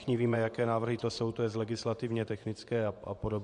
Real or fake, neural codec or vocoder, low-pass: fake; vocoder, 24 kHz, 100 mel bands, Vocos; 10.8 kHz